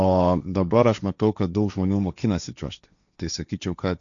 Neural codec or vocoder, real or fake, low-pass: codec, 16 kHz, 1.1 kbps, Voila-Tokenizer; fake; 7.2 kHz